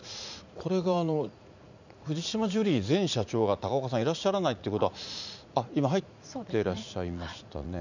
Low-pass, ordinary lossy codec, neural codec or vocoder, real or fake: 7.2 kHz; none; vocoder, 44.1 kHz, 80 mel bands, Vocos; fake